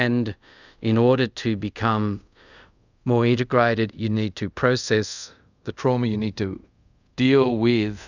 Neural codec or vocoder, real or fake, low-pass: codec, 24 kHz, 0.5 kbps, DualCodec; fake; 7.2 kHz